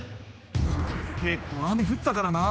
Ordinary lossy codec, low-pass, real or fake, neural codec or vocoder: none; none; fake; codec, 16 kHz, 2 kbps, X-Codec, HuBERT features, trained on general audio